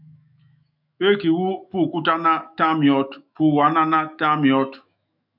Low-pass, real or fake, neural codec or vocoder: 5.4 kHz; fake; autoencoder, 48 kHz, 128 numbers a frame, DAC-VAE, trained on Japanese speech